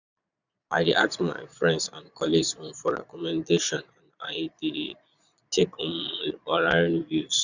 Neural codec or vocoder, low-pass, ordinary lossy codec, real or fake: vocoder, 22.05 kHz, 80 mel bands, Vocos; 7.2 kHz; none; fake